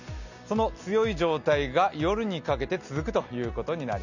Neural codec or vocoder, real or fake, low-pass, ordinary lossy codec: none; real; 7.2 kHz; none